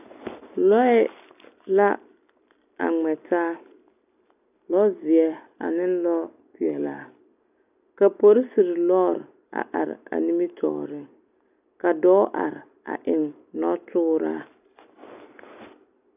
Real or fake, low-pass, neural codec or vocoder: real; 3.6 kHz; none